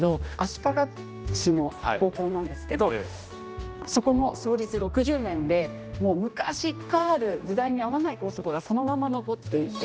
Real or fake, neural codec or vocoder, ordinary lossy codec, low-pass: fake; codec, 16 kHz, 1 kbps, X-Codec, HuBERT features, trained on general audio; none; none